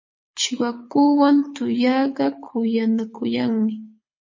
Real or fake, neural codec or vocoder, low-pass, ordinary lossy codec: fake; codec, 24 kHz, 6 kbps, HILCodec; 7.2 kHz; MP3, 32 kbps